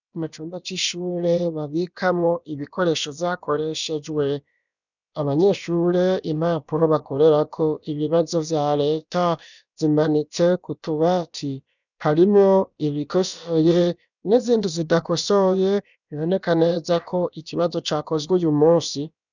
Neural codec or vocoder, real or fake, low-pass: codec, 16 kHz, about 1 kbps, DyCAST, with the encoder's durations; fake; 7.2 kHz